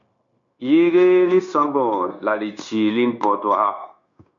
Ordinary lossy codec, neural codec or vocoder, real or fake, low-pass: MP3, 96 kbps; codec, 16 kHz, 0.9 kbps, LongCat-Audio-Codec; fake; 7.2 kHz